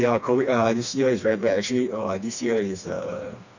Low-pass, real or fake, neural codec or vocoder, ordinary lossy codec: 7.2 kHz; fake; codec, 16 kHz, 2 kbps, FreqCodec, smaller model; none